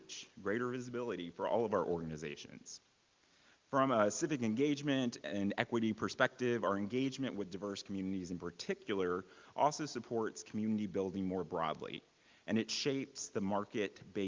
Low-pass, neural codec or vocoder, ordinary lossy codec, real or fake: 7.2 kHz; none; Opus, 24 kbps; real